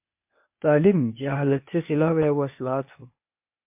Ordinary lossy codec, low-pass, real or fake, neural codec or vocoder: MP3, 32 kbps; 3.6 kHz; fake; codec, 16 kHz, 0.8 kbps, ZipCodec